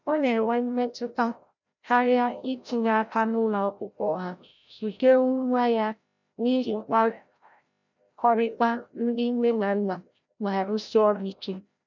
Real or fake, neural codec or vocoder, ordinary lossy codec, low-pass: fake; codec, 16 kHz, 0.5 kbps, FreqCodec, larger model; none; 7.2 kHz